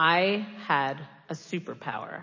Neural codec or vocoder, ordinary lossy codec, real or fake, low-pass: none; MP3, 32 kbps; real; 7.2 kHz